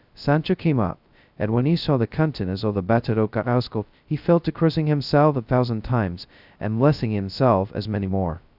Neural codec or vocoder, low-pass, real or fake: codec, 16 kHz, 0.2 kbps, FocalCodec; 5.4 kHz; fake